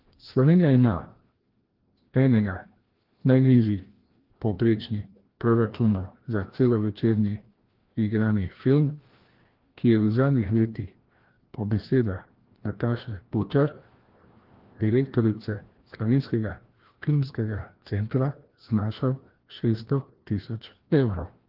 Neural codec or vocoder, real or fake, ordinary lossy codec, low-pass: codec, 16 kHz, 1 kbps, FreqCodec, larger model; fake; Opus, 16 kbps; 5.4 kHz